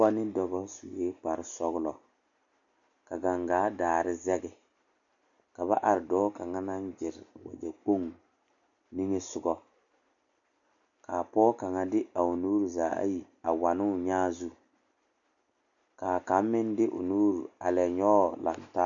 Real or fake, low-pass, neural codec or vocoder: real; 7.2 kHz; none